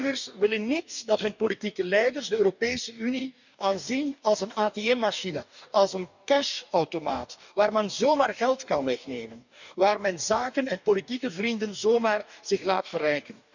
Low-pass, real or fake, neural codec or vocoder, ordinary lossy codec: 7.2 kHz; fake; codec, 44.1 kHz, 2.6 kbps, DAC; none